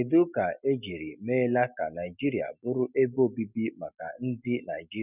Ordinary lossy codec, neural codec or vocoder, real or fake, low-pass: none; none; real; 3.6 kHz